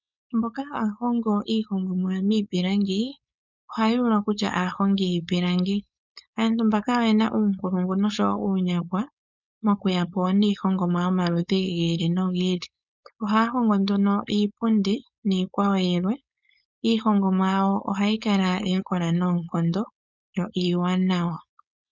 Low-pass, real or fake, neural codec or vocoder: 7.2 kHz; fake; codec, 16 kHz, 4.8 kbps, FACodec